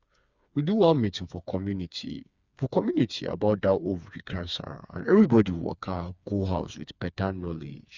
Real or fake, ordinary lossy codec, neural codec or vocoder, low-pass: fake; Opus, 64 kbps; codec, 16 kHz, 4 kbps, FreqCodec, smaller model; 7.2 kHz